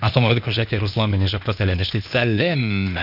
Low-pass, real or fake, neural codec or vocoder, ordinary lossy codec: 5.4 kHz; fake; codec, 16 kHz, 0.8 kbps, ZipCodec; none